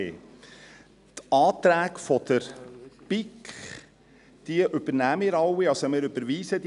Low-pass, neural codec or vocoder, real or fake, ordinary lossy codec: 10.8 kHz; none; real; none